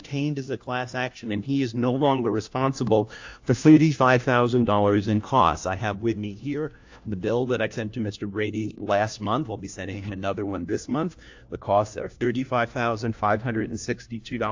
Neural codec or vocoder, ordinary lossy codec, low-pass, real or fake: codec, 16 kHz, 1 kbps, FunCodec, trained on LibriTTS, 50 frames a second; AAC, 48 kbps; 7.2 kHz; fake